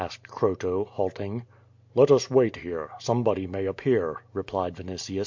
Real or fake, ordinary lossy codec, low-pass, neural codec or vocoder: real; MP3, 48 kbps; 7.2 kHz; none